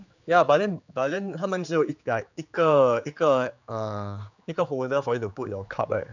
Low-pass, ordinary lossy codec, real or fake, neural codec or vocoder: 7.2 kHz; none; fake; codec, 16 kHz, 4 kbps, X-Codec, HuBERT features, trained on general audio